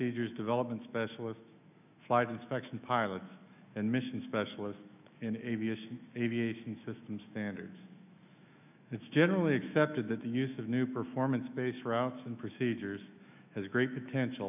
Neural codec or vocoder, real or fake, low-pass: autoencoder, 48 kHz, 128 numbers a frame, DAC-VAE, trained on Japanese speech; fake; 3.6 kHz